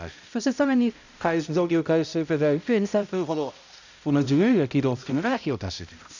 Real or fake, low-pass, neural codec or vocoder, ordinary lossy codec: fake; 7.2 kHz; codec, 16 kHz, 0.5 kbps, X-Codec, HuBERT features, trained on balanced general audio; none